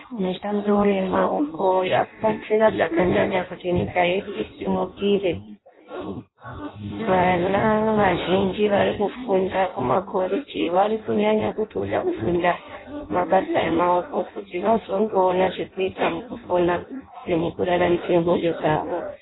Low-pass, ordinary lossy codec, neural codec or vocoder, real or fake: 7.2 kHz; AAC, 16 kbps; codec, 16 kHz in and 24 kHz out, 0.6 kbps, FireRedTTS-2 codec; fake